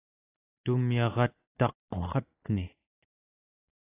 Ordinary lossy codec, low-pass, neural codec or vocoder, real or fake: AAC, 16 kbps; 3.6 kHz; none; real